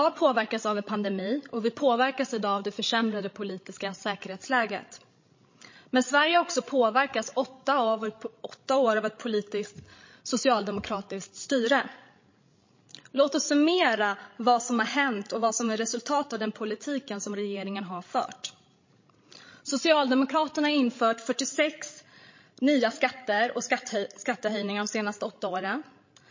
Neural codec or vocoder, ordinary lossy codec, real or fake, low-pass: codec, 16 kHz, 16 kbps, FreqCodec, larger model; MP3, 32 kbps; fake; 7.2 kHz